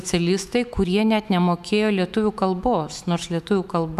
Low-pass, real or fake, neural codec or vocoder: 14.4 kHz; fake; autoencoder, 48 kHz, 128 numbers a frame, DAC-VAE, trained on Japanese speech